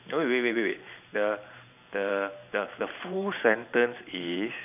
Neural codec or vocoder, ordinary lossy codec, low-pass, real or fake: vocoder, 44.1 kHz, 128 mel bands every 512 samples, BigVGAN v2; none; 3.6 kHz; fake